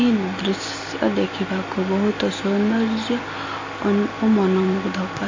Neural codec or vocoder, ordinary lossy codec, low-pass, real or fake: none; MP3, 32 kbps; 7.2 kHz; real